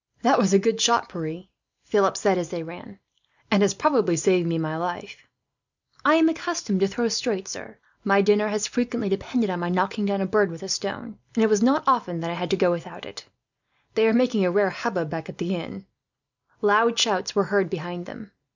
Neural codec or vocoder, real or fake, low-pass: none; real; 7.2 kHz